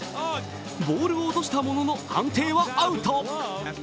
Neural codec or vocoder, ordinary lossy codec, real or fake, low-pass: none; none; real; none